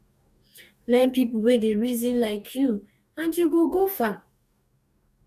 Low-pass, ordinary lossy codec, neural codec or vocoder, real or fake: 14.4 kHz; AAC, 96 kbps; codec, 44.1 kHz, 2.6 kbps, DAC; fake